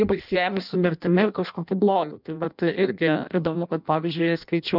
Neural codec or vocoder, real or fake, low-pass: codec, 16 kHz in and 24 kHz out, 0.6 kbps, FireRedTTS-2 codec; fake; 5.4 kHz